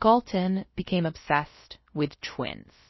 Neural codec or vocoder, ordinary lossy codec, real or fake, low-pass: codec, 16 kHz, about 1 kbps, DyCAST, with the encoder's durations; MP3, 24 kbps; fake; 7.2 kHz